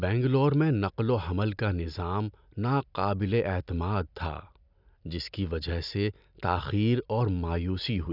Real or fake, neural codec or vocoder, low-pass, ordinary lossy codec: real; none; 5.4 kHz; none